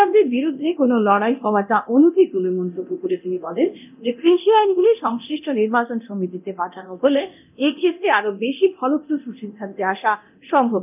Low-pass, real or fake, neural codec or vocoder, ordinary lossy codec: 3.6 kHz; fake; codec, 24 kHz, 0.9 kbps, DualCodec; none